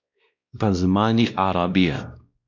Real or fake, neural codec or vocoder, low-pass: fake; codec, 16 kHz, 1 kbps, X-Codec, WavLM features, trained on Multilingual LibriSpeech; 7.2 kHz